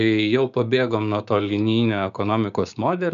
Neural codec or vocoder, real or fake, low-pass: codec, 16 kHz, 6 kbps, DAC; fake; 7.2 kHz